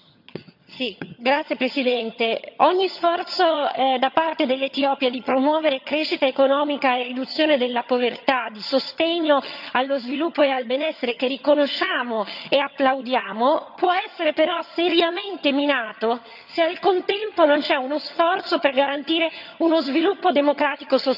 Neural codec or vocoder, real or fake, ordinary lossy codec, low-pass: vocoder, 22.05 kHz, 80 mel bands, HiFi-GAN; fake; none; 5.4 kHz